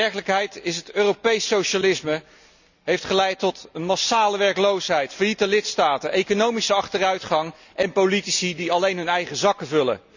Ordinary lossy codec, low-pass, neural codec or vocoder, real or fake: none; 7.2 kHz; none; real